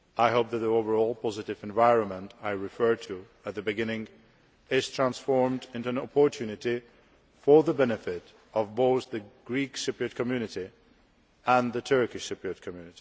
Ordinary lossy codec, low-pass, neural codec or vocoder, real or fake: none; none; none; real